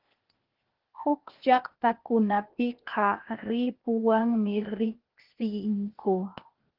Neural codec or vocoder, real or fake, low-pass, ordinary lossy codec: codec, 16 kHz, 0.8 kbps, ZipCodec; fake; 5.4 kHz; Opus, 16 kbps